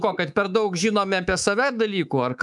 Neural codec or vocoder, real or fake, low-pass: codec, 24 kHz, 3.1 kbps, DualCodec; fake; 10.8 kHz